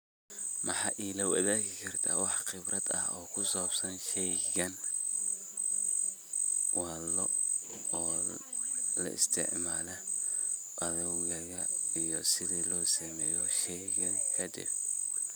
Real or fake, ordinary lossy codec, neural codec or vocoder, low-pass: real; none; none; none